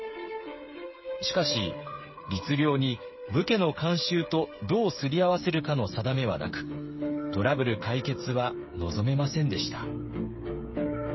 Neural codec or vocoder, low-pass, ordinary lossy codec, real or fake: codec, 16 kHz, 8 kbps, FreqCodec, smaller model; 7.2 kHz; MP3, 24 kbps; fake